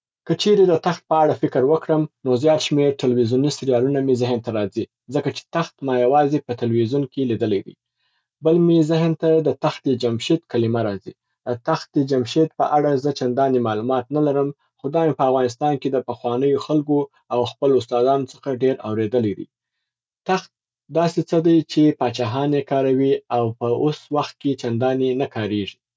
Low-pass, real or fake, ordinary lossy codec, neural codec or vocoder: none; real; none; none